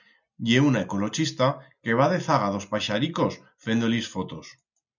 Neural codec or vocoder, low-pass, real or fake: none; 7.2 kHz; real